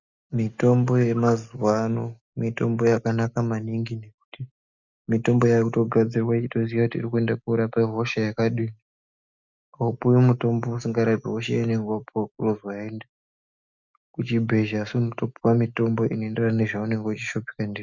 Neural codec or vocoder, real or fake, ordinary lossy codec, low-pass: none; real; Opus, 64 kbps; 7.2 kHz